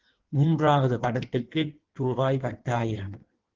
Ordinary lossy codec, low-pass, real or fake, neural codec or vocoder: Opus, 16 kbps; 7.2 kHz; fake; codec, 16 kHz in and 24 kHz out, 1.1 kbps, FireRedTTS-2 codec